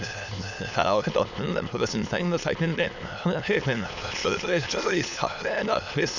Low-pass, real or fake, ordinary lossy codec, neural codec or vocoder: 7.2 kHz; fake; none; autoencoder, 22.05 kHz, a latent of 192 numbers a frame, VITS, trained on many speakers